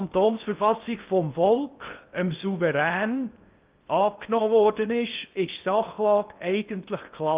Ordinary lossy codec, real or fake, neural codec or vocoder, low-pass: Opus, 24 kbps; fake; codec, 16 kHz in and 24 kHz out, 0.6 kbps, FocalCodec, streaming, 4096 codes; 3.6 kHz